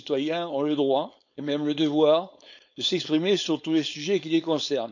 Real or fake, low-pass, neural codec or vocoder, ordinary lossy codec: fake; 7.2 kHz; codec, 16 kHz, 4.8 kbps, FACodec; none